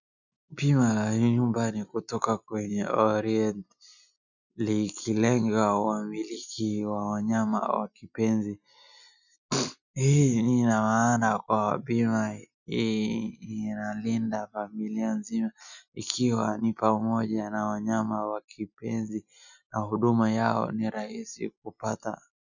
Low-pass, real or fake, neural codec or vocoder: 7.2 kHz; real; none